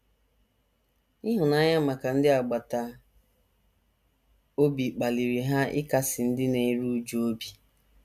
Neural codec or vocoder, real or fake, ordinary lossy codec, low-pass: none; real; none; 14.4 kHz